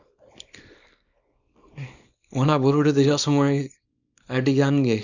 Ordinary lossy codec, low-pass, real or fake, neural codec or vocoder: none; 7.2 kHz; fake; codec, 24 kHz, 0.9 kbps, WavTokenizer, small release